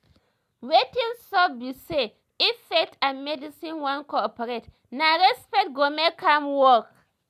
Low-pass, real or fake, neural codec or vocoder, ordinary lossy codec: 14.4 kHz; real; none; none